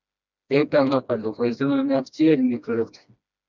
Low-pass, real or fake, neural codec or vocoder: 7.2 kHz; fake; codec, 16 kHz, 1 kbps, FreqCodec, smaller model